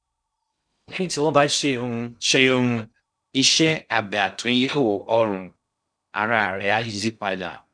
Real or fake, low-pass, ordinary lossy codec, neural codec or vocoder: fake; 9.9 kHz; none; codec, 16 kHz in and 24 kHz out, 0.6 kbps, FocalCodec, streaming, 2048 codes